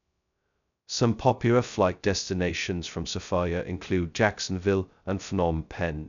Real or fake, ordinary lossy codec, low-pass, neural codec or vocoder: fake; none; 7.2 kHz; codec, 16 kHz, 0.2 kbps, FocalCodec